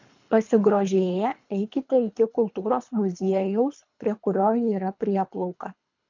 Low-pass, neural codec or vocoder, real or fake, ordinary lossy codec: 7.2 kHz; codec, 24 kHz, 3 kbps, HILCodec; fake; MP3, 64 kbps